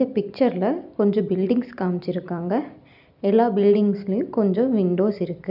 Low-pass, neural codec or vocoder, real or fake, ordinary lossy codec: 5.4 kHz; none; real; none